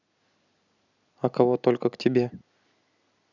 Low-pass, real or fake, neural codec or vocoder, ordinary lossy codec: 7.2 kHz; real; none; none